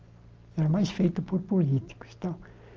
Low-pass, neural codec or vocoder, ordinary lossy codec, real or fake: 7.2 kHz; none; Opus, 24 kbps; real